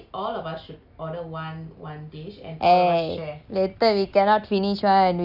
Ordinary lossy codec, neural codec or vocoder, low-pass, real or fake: none; none; 5.4 kHz; real